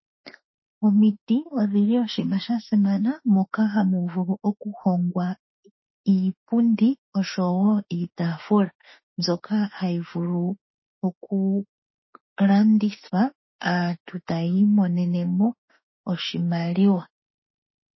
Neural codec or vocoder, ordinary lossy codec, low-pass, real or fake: autoencoder, 48 kHz, 32 numbers a frame, DAC-VAE, trained on Japanese speech; MP3, 24 kbps; 7.2 kHz; fake